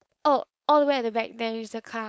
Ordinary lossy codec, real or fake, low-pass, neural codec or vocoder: none; fake; none; codec, 16 kHz, 4.8 kbps, FACodec